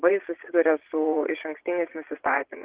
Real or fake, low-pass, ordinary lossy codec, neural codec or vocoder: fake; 3.6 kHz; Opus, 16 kbps; vocoder, 22.05 kHz, 80 mel bands, Vocos